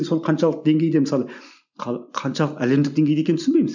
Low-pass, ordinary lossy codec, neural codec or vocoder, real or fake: 7.2 kHz; none; none; real